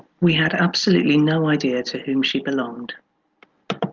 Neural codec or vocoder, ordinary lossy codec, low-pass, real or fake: none; Opus, 32 kbps; 7.2 kHz; real